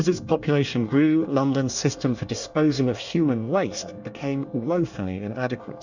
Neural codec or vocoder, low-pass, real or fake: codec, 24 kHz, 1 kbps, SNAC; 7.2 kHz; fake